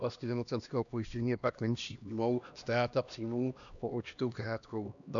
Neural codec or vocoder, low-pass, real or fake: codec, 16 kHz, 1 kbps, X-Codec, HuBERT features, trained on LibriSpeech; 7.2 kHz; fake